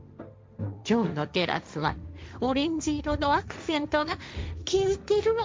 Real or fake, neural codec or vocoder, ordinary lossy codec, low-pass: fake; codec, 16 kHz, 1.1 kbps, Voila-Tokenizer; none; none